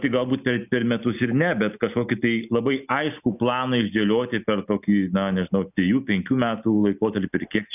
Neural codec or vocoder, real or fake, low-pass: none; real; 3.6 kHz